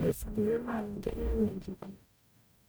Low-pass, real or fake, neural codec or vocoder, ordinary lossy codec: none; fake; codec, 44.1 kHz, 0.9 kbps, DAC; none